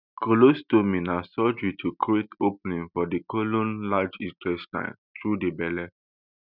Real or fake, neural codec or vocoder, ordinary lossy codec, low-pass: real; none; none; 5.4 kHz